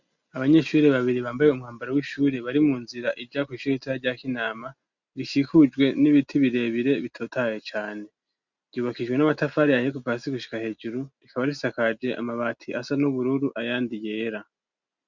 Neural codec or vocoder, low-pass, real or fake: none; 7.2 kHz; real